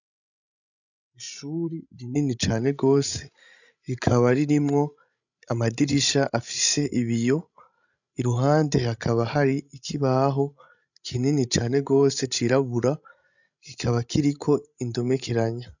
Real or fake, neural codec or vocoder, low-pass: fake; codec, 16 kHz, 16 kbps, FreqCodec, larger model; 7.2 kHz